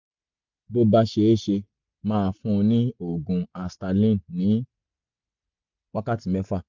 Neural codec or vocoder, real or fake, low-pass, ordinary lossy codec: none; real; 7.2 kHz; MP3, 64 kbps